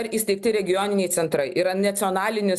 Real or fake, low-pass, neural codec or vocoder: real; 14.4 kHz; none